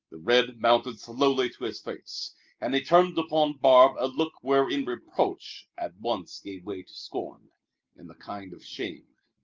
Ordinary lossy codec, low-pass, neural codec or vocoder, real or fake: Opus, 32 kbps; 7.2 kHz; none; real